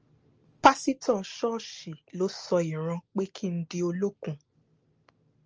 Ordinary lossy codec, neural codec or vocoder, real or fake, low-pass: Opus, 24 kbps; none; real; 7.2 kHz